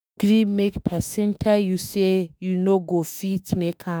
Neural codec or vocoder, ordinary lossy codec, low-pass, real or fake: autoencoder, 48 kHz, 32 numbers a frame, DAC-VAE, trained on Japanese speech; none; none; fake